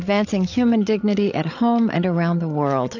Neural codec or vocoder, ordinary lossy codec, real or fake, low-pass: codec, 16 kHz, 8 kbps, FreqCodec, larger model; AAC, 48 kbps; fake; 7.2 kHz